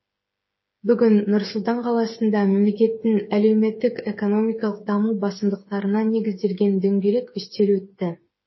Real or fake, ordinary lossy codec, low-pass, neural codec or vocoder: fake; MP3, 24 kbps; 7.2 kHz; codec, 16 kHz, 8 kbps, FreqCodec, smaller model